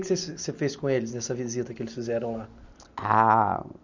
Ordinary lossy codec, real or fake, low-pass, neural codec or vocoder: none; real; 7.2 kHz; none